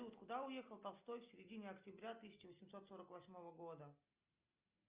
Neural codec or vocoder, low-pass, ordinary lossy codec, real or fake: none; 3.6 kHz; Opus, 32 kbps; real